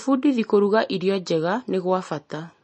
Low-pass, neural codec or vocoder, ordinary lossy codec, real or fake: 10.8 kHz; none; MP3, 32 kbps; real